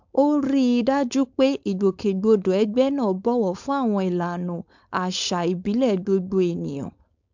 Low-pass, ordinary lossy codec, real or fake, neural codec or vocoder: 7.2 kHz; MP3, 64 kbps; fake; codec, 16 kHz, 4.8 kbps, FACodec